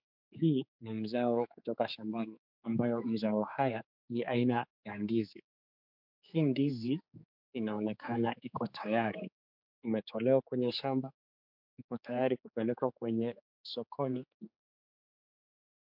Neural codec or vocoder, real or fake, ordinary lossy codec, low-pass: codec, 16 kHz, 4 kbps, X-Codec, HuBERT features, trained on general audio; fake; MP3, 48 kbps; 5.4 kHz